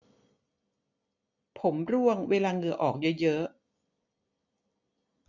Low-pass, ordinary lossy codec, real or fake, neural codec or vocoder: 7.2 kHz; none; real; none